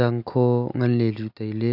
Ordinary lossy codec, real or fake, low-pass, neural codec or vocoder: none; real; 5.4 kHz; none